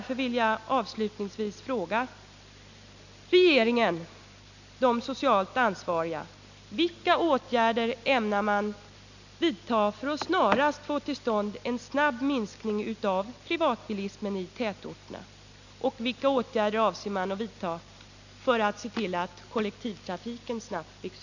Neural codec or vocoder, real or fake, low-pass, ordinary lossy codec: none; real; 7.2 kHz; none